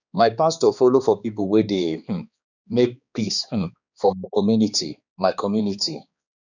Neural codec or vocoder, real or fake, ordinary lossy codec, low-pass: codec, 16 kHz, 2 kbps, X-Codec, HuBERT features, trained on balanced general audio; fake; none; 7.2 kHz